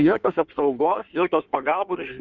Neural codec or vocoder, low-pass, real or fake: codec, 16 kHz in and 24 kHz out, 1.1 kbps, FireRedTTS-2 codec; 7.2 kHz; fake